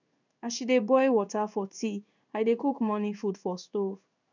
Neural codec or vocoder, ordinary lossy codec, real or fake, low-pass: codec, 16 kHz in and 24 kHz out, 1 kbps, XY-Tokenizer; none; fake; 7.2 kHz